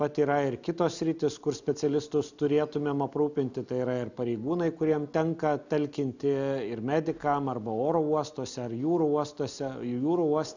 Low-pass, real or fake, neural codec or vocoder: 7.2 kHz; real; none